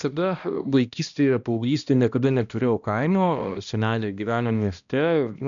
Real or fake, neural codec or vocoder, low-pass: fake; codec, 16 kHz, 1 kbps, X-Codec, HuBERT features, trained on balanced general audio; 7.2 kHz